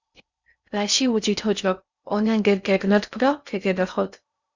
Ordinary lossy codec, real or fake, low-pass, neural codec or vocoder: Opus, 64 kbps; fake; 7.2 kHz; codec, 16 kHz in and 24 kHz out, 0.6 kbps, FocalCodec, streaming, 4096 codes